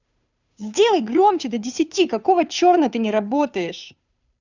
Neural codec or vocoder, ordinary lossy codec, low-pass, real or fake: codec, 16 kHz, 2 kbps, FunCodec, trained on Chinese and English, 25 frames a second; none; 7.2 kHz; fake